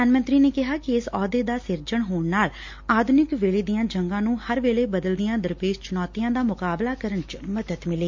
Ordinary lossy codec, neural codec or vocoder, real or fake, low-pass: MP3, 48 kbps; none; real; 7.2 kHz